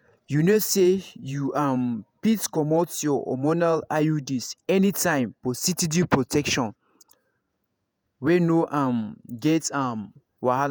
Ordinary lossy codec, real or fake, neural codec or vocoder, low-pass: none; fake; vocoder, 48 kHz, 128 mel bands, Vocos; none